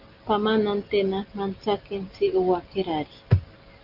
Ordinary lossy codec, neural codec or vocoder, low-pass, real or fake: Opus, 24 kbps; none; 5.4 kHz; real